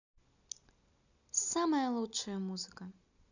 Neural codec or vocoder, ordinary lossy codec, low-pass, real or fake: none; none; 7.2 kHz; real